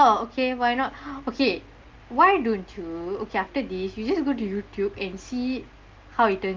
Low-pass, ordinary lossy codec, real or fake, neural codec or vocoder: 7.2 kHz; Opus, 32 kbps; real; none